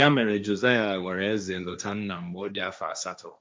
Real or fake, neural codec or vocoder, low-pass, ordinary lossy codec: fake; codec, 16 kHz, 1.1 kbps, Voila-Tokenizer; none; none